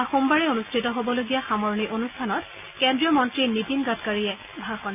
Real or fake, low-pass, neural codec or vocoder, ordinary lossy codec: real; 3.6 kHz; none; none